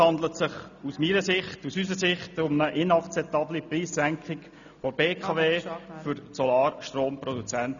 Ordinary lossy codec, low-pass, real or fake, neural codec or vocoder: none; 7.2 kHz; real; none